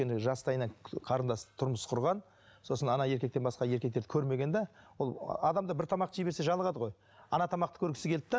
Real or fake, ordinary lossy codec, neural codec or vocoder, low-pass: real; none; none; none